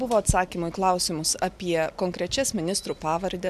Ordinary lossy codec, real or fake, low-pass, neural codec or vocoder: MP3, 96 kbps; real; 14.4 kHz; none